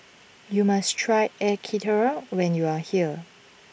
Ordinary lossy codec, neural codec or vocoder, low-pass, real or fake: none; none; none; real